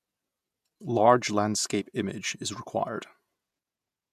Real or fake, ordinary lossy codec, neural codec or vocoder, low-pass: fake; none; vocoder, 48 kHz, 128 mel bands, Vocos; 14.4 kHz